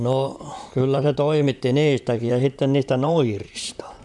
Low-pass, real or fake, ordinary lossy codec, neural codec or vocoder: 10.8 kHz; fake; none; vocoder, 44.1 kHz, 128 mel bands, Pupu-Vocoder